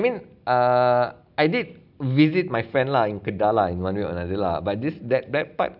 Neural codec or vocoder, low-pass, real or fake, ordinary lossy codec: none; 5.4 kHz; real; none